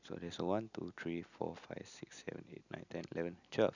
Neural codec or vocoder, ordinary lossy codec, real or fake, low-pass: none; none; real; 7.2 kHz